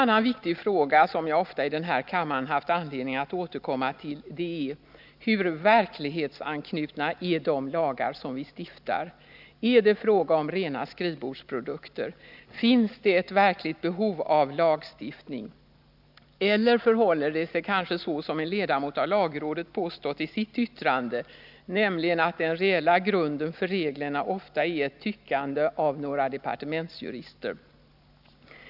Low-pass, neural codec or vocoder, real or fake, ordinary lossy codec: 5.4 kHz; none; real; none